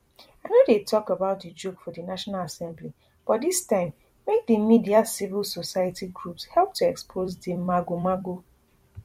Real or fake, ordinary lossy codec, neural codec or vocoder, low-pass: fake; MP3, 64 kbps; vocoder, 44.1 kHz, 128 mel bands every 256 samples, BigVGAN v2; 19.8 kHz